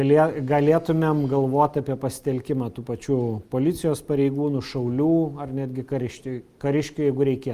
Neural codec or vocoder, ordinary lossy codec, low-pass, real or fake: none; Opus, 32 kbps; 14.4 kHz; real